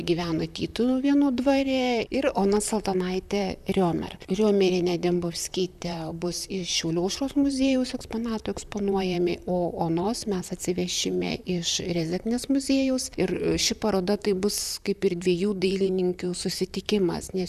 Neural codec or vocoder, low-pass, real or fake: vocoder, 44.1 kHz, 128 mel bands, Pupu-Vocoder; 14.4 kHz; fake